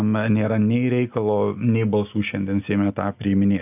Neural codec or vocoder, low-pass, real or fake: none; 3.6 kHz; real